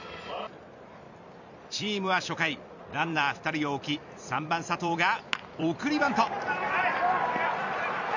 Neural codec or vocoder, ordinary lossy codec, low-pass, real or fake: vocoder, 44.1 kHz, 128 mel bands every 256 samples, BigVGAN v2; none; 7.2 kHz; fake